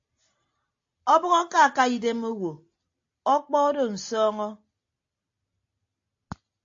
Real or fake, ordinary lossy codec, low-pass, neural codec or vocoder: real; AAC, 48 kbps; 7.2 kHz; none